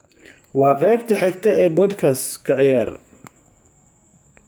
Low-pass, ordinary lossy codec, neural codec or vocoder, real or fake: none; none; codec, 44.1 kHz, 2.6 kbps, SNAC; fake